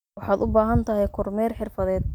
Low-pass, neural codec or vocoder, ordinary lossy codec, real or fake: 19.8 kHz; none; none; real